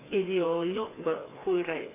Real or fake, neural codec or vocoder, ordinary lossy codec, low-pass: fake; codec, 16 kHz, 2 kbps, FreqCodec, larger model; AAC, 16 kbps; 3.6 kHz